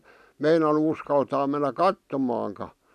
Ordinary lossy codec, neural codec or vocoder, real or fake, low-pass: none; none; real; 14.4 kHz